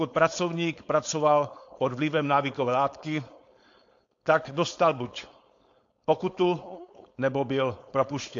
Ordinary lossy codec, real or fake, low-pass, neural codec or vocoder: AAC, 48 kbps; fake; 7.2 kHz; codec, 16 kHz, 4.8 kbps, FACodec